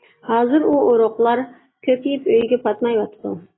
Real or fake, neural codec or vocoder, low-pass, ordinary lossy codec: real; none; 7.2 kHz; AAC, 16 kbps